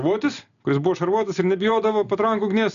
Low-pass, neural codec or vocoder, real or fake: 7.2 kHz; none; real